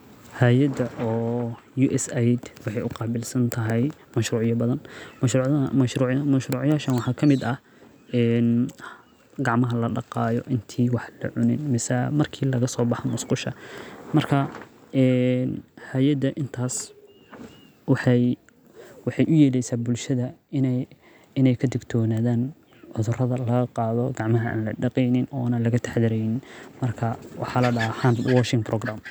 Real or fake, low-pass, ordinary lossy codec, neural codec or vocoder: real; none; none; none